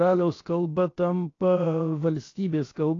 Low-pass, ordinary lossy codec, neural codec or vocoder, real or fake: 7.2 kHz; AAC, 32 kbps; codec, 16 kHz, about 1 kbps, DyCAST, with the encoder's durations; fake